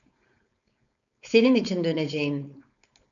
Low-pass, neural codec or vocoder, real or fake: 7.2 kHz; codec, 16 kHz, 4.8 kbps, FACodec; fake